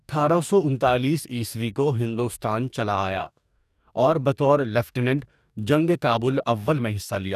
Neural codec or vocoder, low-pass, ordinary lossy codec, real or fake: codec, 44.1 kHz, 2.6 kbps, DAC; 14.4 kHz; none; fake